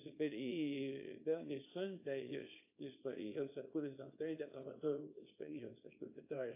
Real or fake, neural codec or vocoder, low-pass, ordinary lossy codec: fake; codec, 24 kHz, 0.9 kbps, WavTokenizer, small release; 3.6 kHz; AAC, 32 kbps